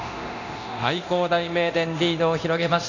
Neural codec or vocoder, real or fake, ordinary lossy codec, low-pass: codec, 24 kHz, 0.9 kbps, DualCodec; fake; none; 7.2 kHz